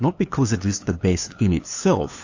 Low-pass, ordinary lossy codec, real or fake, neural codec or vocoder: 7.2 kHz; AAC, 48 kbps; fake; codec, 16 kHz, 2 kbps, FunCodec, trained on LibriTTS, 25 frames a second